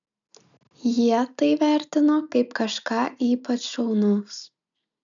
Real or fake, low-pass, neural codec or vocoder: real; 7.2 kHz; none